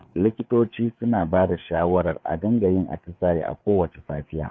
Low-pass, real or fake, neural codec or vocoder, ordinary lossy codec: none; fake; codec, 16 kHz, 4 kbps, FreqCodec, larger model; none